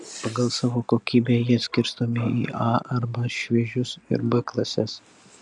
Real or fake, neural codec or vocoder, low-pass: real; none; 10.8 kHz